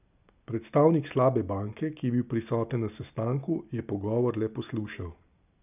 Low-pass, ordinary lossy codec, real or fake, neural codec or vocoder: 3.6 kHz; none; real; none